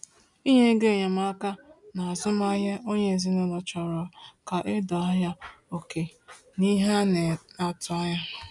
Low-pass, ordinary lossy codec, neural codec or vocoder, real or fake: 10.8 kHz; none; none; real